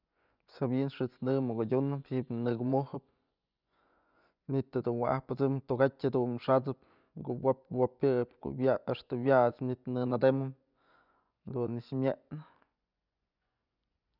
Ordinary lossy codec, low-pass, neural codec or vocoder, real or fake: none; 5.4 kHz; vocoder, 24 kHz, 100 mel bands, Vocos; fake